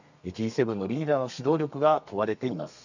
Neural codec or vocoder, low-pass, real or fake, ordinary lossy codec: codec, 32 kHz, 1.9 kbps, SNAC; 7.2 kHz; fake; none